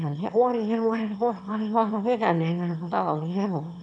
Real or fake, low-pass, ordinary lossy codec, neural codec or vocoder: fake; none; none; autoencoder, 22.05 kHz, a latent of 192 numbers a frame, VITS, trained on one speaker